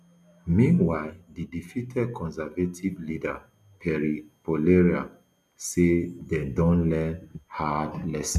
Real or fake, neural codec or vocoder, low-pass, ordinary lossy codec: real; none; 14.4 kHz; none